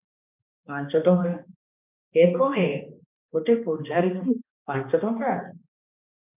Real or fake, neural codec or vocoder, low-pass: fake; codec, 16 kHz, 2 kbps, X-Codec, HuBERT features, trained on balanced general audio; 3.6 kHz